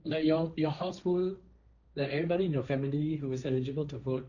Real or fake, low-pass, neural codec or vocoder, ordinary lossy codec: fake; 7.2 kHz; codec, 16 kHz, 1.1 kbps, Voila-Tokenizer; none